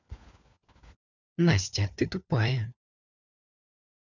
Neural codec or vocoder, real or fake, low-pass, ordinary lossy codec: codec, 16 kHz, 4 kbps, FunCodec, trained on LibriTTS, 50 frames a second; fake; 7.2 kHz; none